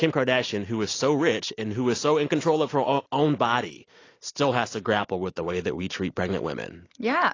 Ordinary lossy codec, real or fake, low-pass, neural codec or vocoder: AAC, 32 kbps; real; 7.2 kHz; none